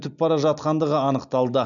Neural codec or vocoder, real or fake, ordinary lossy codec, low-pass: none; real; none; 7.2 kHz